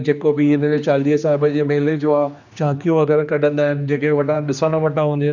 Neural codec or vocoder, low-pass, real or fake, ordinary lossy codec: codec, 16 kHz, 2 kbps, X-Codec, HuBERT features, trained on general audio; 7.2 kHz; fake; none